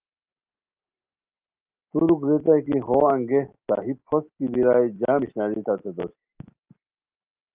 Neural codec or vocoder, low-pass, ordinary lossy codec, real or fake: none; 3.6 kHz; Opus, 24 kbps; real